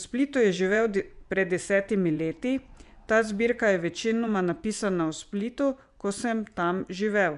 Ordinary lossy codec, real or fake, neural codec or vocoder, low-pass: none; fake; vocoder, 24 kHz, 100 mel bands, Vocos; 10.8 kHz